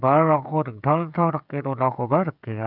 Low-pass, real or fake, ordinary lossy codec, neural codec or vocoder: 5.4 kHz; fake; none; codec, 16 kHz, 16 kbps, FreqCodec, smaller model